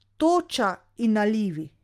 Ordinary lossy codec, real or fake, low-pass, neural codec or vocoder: Opus, 32 kbps; real; 14.4 kHz; none